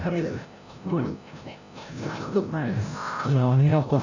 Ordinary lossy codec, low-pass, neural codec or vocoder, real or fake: none; 7.2 kHz; codec, 16 kHz, 0.5 kbps, FreqCodec, larger model; fake